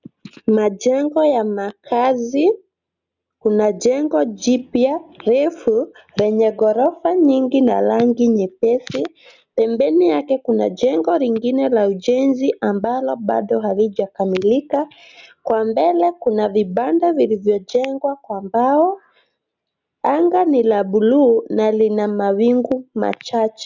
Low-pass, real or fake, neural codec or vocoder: 7.2 kHz; real; none